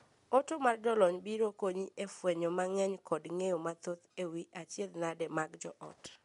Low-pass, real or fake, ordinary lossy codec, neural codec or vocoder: 10.8 kHz; real; MP3, 64 kbps; none